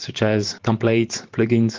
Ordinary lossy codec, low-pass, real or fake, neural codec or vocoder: Opus, 16 kbps; 7.2 kHz; fake; vocoder, 44.1 kHz, 128 mel bands every 512 samples, BigVGAN v2